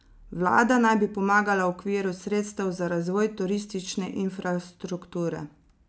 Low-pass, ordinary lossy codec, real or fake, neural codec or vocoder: none; none; real; none